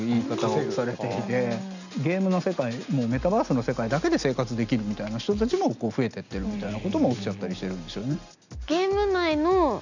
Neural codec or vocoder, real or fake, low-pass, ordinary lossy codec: none; real; 7.2 kHz; none